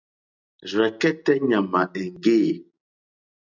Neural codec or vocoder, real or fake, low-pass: none; real; 7.2 kHz